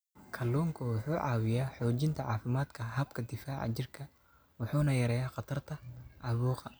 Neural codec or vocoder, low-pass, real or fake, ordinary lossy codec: none; none; real; none